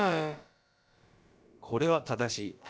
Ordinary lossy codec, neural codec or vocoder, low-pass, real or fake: none; codec, 16 kHz, about 1 kbps, DyCAST, with the encoder's durations; none; fake